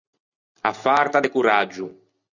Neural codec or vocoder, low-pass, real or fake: none; 7.2 kHz; real